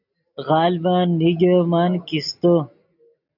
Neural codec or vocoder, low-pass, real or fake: none; 5.4 kHz; real